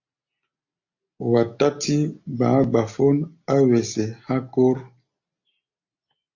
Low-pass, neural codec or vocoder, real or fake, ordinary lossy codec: 7.2 kHz; none; real; AAC, 48 kbps